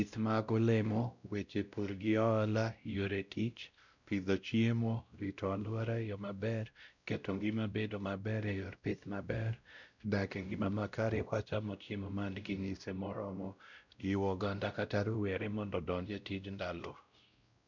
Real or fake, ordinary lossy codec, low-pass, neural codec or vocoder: fake; none; 7.2 kHz; codec, 16 kHz, 0.5 kbps, X-Codec, WavLM features, trained on Multilingual LibriSpeech